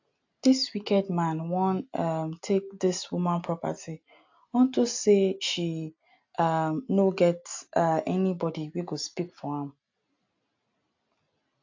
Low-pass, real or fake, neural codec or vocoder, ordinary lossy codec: 7.2 kHz; real; none; AAC, 48 kbps